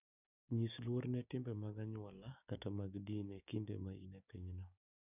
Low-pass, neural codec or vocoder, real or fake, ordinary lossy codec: 3.6 kHz; codec, 16 kHz, 6 kbps, DAC; fake; none